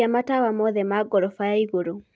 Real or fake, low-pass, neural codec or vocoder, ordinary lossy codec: real; none; none; none